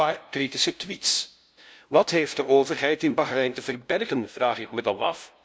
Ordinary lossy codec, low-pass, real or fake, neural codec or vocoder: none; none; fake; codec, 16 kHz, 0.5 kbps, FunCodec, trained on LibriTTS, 25 frames a second